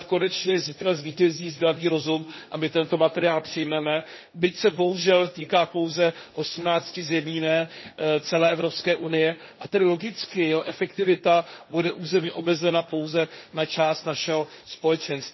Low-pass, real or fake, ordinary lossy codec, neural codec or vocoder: 7.2 kHz; fake; MP3, 24 kbps; codec, 16 kHz, 1.1 kbps, Voila-Tokenizer